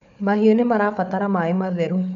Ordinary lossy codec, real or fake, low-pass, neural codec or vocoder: none; fake; 7.2 kHz; codec, 16 kHz, 4 kbps, FunCodec, trained on Chinese and English, 50 frames a second